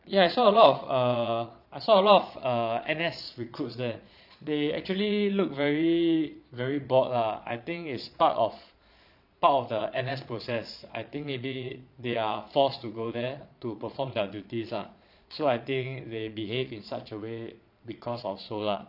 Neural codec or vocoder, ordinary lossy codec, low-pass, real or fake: vocoder, 22.05 kHz, 80 mel bands, Vocos; none; 5.4 kHz; fake